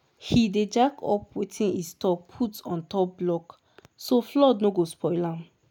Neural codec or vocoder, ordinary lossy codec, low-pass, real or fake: none; none; 19.8 kHz; real